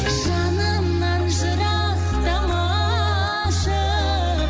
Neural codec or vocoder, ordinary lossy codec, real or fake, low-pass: none; none; real; none